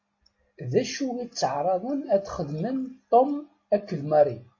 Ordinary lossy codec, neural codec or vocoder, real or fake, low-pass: MP3, 32 kbps; none; real; 7.2 kHz